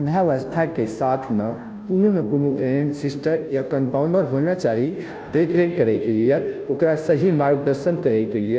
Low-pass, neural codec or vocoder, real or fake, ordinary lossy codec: none; codec, 16 kHz, 0.5 kbps, FunCodec, trained on Chinese and English, 25 frames a second; fake; none